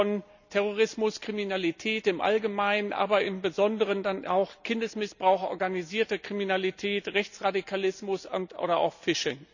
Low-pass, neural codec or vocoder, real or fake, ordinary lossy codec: 7.2 kHz; none; real; none